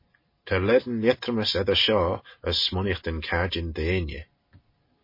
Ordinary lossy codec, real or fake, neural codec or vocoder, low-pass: MP3, 24 kbps; real; none; 5.4 kHz